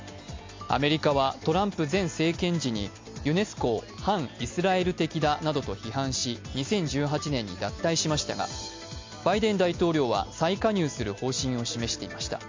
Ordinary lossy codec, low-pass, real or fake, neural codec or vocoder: MP3, 48 kbps; 7.2 kHz; real; none